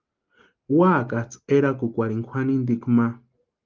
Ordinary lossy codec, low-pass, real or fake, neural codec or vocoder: Opus, 24 kbps; 7.2 kHz; real; none